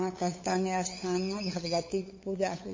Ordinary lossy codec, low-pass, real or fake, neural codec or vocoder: MP3, 32 kbps; 7.2 kHz; fake; codec, 16 kHz, 8 kbps, FunCodec, trained on LibriTTS, 25 frames a second